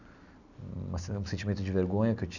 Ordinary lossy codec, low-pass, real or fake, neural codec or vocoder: none; 7.2 kHz; real; none